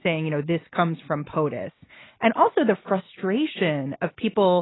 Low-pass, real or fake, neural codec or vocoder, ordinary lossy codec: 7.2 kHz; real; none; AAC, 16 kbps